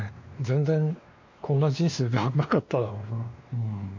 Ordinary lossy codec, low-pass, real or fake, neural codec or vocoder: MP3, 48 kbps; 7.2 kHz; fake; codec, 16 kHz, 2 kbps, X-Codec, WavLM features, trained on Multilingual LibriSpeech